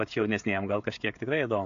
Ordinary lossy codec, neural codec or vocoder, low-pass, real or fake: AAC, 64 kbps; codec, 16 kHz, 16 kbps, FreqCodec, smaller model; 7.2 kHz; fake